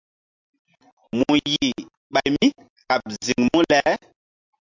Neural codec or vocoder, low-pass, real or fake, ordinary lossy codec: none; 7.2 kHz; real; MP3, 64 kbps